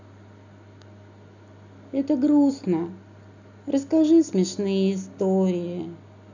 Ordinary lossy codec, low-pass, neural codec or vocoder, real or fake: none; 7.2 kHz; none; real